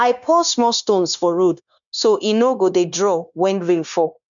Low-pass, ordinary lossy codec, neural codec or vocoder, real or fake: 7.2 kHz; none; codec, 16 kHz, 0.9 kbps, LongCat-Audio-Codec; fake